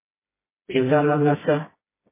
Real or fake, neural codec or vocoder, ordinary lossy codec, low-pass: fake; codec, 16 kHz, 1 kbps, FreqCodec, smaller model; MP3, 16 kbps; 3.6 kHz